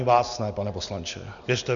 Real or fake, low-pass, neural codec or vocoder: real; 7.2 kHz; none